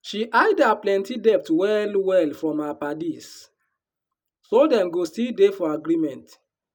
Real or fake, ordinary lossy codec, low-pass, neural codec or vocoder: real; none; 19.8 kHz; none